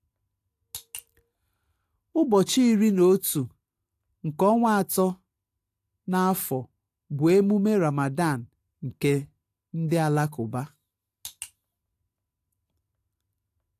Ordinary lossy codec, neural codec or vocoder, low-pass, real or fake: AAC, 64 kbps; none; 14.4 kHz; real